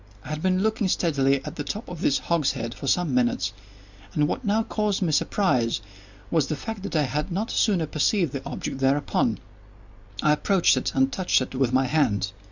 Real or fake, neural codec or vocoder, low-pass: real; none; 7.2 kHz